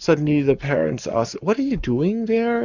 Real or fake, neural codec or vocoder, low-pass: fake; codec, 44.1 kHz, 7.8 kbps, Pupu-Codec; 7.2 kHz